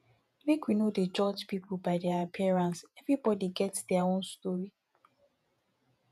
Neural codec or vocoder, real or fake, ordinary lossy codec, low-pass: vocoder, 48 kHz, 128 mel bands, Vocos; fake; none; 14.4 kHz